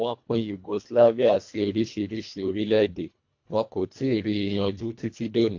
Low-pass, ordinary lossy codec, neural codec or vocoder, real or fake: 7.2 kHz; AAC, 48 kbps; codec, 24 kHz, 1.5 kbps, HILCodec; fake